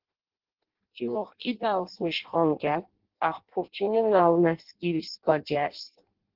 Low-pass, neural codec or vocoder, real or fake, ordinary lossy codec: 5.4 kHz; codec, 16 kHz in and 24 kHz out, 0.6 kbps, FireRedTTS-2 codec; fake; Opus, 16 kbps